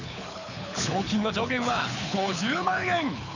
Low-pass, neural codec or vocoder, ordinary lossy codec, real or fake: 7.2 kHz; codec, 24 kHz, 6 kbps, HILCodec; none; fake